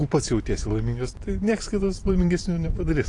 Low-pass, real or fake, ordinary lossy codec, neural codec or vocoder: 10.8 kHz; fake; AAC, 48 kbps; vocoder, 44.1 kHz, 128 mel bands every 512 samples, BigVGAN v2